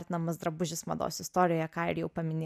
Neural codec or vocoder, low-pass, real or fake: none; 14.4 kHz; real